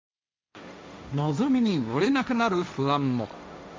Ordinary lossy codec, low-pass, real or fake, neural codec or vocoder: none; none; fake; codec, 16 kHz, 1.1 kbps, Voila-Tokenizer